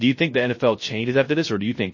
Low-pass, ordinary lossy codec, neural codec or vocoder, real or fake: 7.2 kHz; MP3, 32 kbps; codec, 16 kHz, 0.3 kbps, FocalCodec; fake